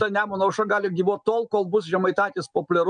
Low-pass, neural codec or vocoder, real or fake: 9.9 kHz; none; real